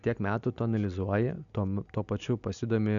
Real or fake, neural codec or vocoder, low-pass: real; none; 7.2 kHz